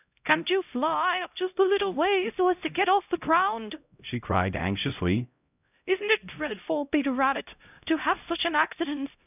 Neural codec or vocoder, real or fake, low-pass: codec, 16 kHz, 0.5 kbps, X-Codec, HuBERT features, trained on LibriSpeech; fake; 3.6 kHz